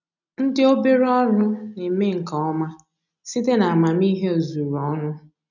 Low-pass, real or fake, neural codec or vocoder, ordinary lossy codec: 7.2 kHz; real; none; none